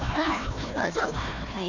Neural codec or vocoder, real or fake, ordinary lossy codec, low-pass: codec, 16 kHz, 1 kbps, FunCodec, trained on Chinese and English, 50 frames a second; fake; none; 7.2 kHz